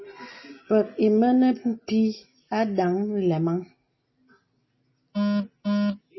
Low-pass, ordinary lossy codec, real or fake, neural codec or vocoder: 7.2 kHz; MP3, 24 kbps; real; none